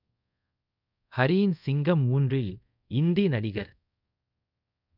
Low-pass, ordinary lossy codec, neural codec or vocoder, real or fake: 5.4 kHz; none; codec, 24 kHz, 0.5 kbps, DualCodec; fake